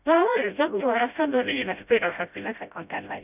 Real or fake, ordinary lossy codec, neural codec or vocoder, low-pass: fake; none; codec, 16 kHz, 0.5 kbps, FreqCodec, smaller model; 3.6 kHz